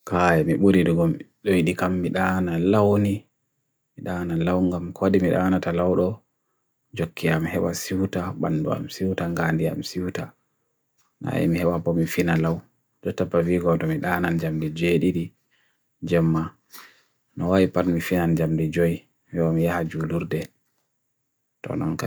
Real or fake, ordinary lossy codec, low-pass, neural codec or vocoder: real; none; none; none